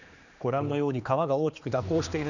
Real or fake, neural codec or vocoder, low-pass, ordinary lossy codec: fake; codec, 16 kHz, 2 kbps, X-Codec, HuBERT features, trained on general audio; 7.2 kHz; none